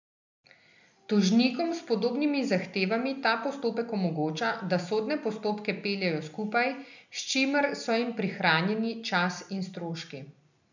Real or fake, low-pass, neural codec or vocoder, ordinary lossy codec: real; 7.2 kHz; none; none